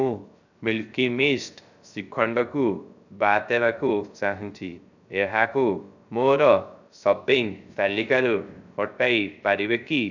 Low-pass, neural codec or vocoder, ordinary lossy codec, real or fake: 7.2 kHz; codec, 16 kHz, 0.3 kbps, FocalCodec; none; fake